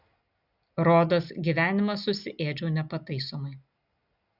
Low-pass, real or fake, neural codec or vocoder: 5.4 kHz; real; none